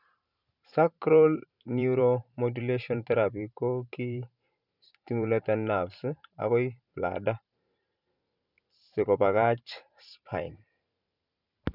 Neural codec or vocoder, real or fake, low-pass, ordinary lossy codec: vocoder, 44.1 kHz, 128 mel bands every 512 samples, BigVGAN v2; fake; 5.4 kHz; none